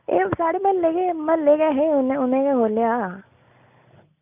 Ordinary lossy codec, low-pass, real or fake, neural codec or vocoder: none; 3.6 kHz; real; none